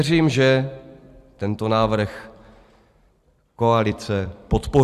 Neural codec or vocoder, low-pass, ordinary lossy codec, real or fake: vocoder, 44.1 kHz, 128 mel bands every 256 samples, BigVGAN v2; 14.4 kHz; Opus, 64 kbps; fake